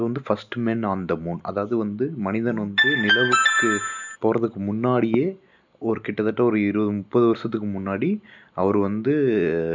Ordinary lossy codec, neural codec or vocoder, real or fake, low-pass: none; none; real; 7.2 kHz